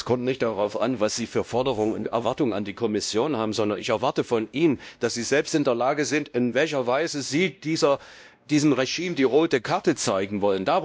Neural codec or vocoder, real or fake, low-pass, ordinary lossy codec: codec, 16 kHz, 1 kbps, X-Codec, WavLM features, trained on Multilingual LibriSpeech; fake; none; none